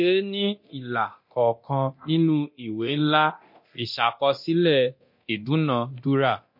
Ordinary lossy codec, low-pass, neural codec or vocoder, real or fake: MP3, 32 kbps; 5.4 kHz; codec, 24 kHz, 0.9 kbps, DualCodec; fake